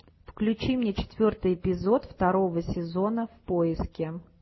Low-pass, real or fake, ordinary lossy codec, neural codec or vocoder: 7.2 kHz; real; MP3, 24 kbps; none